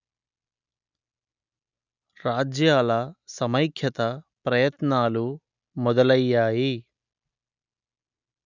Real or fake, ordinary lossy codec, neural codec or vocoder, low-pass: real; none; none; 7.2 kHz